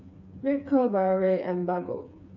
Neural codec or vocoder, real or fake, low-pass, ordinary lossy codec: codec, 16 kHz, 4 kbps, FreqCodec, smaller model; fake; 7.2 kHz; none